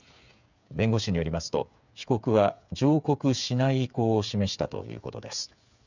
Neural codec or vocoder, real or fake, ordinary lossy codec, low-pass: codec, 16 kHz, 8 kbps, FreqCodec, smaller model; fake; none; 7.2 kHz